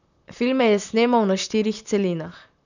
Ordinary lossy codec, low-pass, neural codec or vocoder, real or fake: none; 7.2 kHz; vocoder, 44.1 kHz, 128 mel bands, Pupu-Vocoder; fake